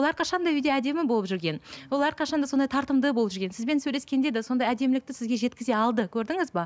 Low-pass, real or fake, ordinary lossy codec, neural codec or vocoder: none; real; none; none